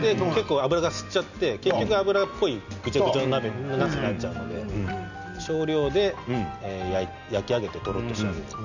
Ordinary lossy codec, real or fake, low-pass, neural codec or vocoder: none; real; 7.2 kHz; none